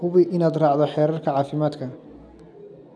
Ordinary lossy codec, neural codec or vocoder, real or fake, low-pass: none; none; real; none